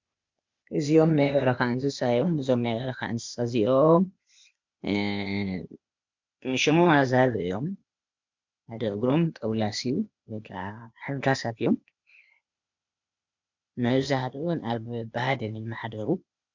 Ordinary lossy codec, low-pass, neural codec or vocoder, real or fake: MP3, 64 kbps; 7.2 kHz; codec, 16 kHz, 0.8 kbps, ZipCodec; fake